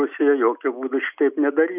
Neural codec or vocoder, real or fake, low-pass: none; real; 3.6 kHz